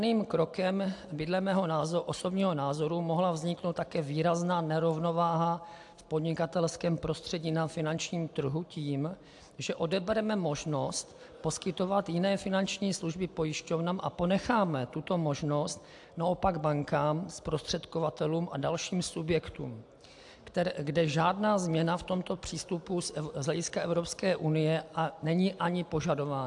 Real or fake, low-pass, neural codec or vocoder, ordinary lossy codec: real; 10.8 kHz; none; AAC, 64 kbps